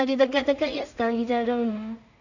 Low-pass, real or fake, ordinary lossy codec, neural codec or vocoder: 7.2 kHz; fake; none; codec, 16 kHz in and 24 kHz out, 0.4 kbps, LongCat-Audio-Codec, two codebook decoder